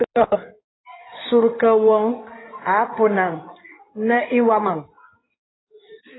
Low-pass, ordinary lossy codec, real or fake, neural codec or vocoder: 7.2 kHz; AAC, 16 kbps; fake; codec, 16 kHz in and 24 kHz out, 2.2 kbps, FireRedTTS-2 codec